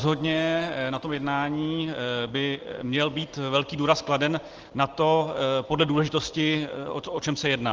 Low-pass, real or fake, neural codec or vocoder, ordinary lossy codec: 7.2 kHz; real; none; Opus, 16 kbps